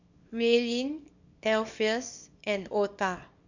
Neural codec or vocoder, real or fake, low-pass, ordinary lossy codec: codec, 24 kHz, 0.9 kbps, WavTokenizer, small release; fake; 7.2 kHz; none